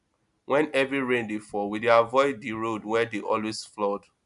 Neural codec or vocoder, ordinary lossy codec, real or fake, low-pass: none; none; real; 10.8 kHz